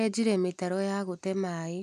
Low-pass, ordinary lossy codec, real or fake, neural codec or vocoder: 14.4 kHz; none; real; none